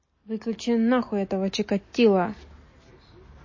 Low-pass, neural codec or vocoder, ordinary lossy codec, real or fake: 7.2 kHz; none; MP3, 32 kbps; real